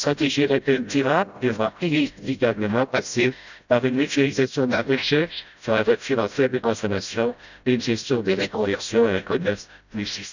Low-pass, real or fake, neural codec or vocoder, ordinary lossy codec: 7.2 kHz; fake; codec, 16 kHz, 0.5 kbps, FreqCodec, smaller model; none